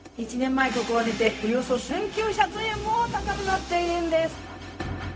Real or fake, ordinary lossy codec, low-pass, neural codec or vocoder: fake; none; none; codec, 16 kHz, 0.4 kbps, LongCat-Audio-Codec